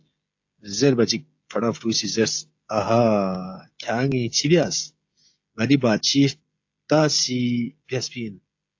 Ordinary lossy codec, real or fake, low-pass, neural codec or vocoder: AAC, 48 kbps; fake; 7.2 kHz; codec, 16 kHz, 16 kbps, FreqCodec, smaller model